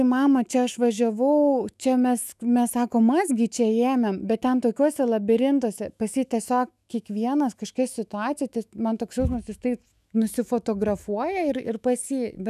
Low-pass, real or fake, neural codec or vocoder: 14.4 kHz; fake; autoencoder, 48 kHz, 128 numbers a frame, DAC-VAE, trained on Japanese speech